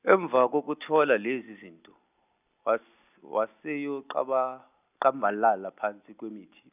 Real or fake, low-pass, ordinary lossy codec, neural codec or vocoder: real; 3.6 kHz; none; none